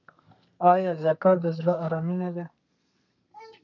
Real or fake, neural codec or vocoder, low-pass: fake; codec, 32 kHz, 1.9 kbps, SNAC; 7.2 kHz